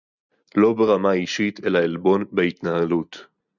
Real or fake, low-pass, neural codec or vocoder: real; 7.2 kHz; none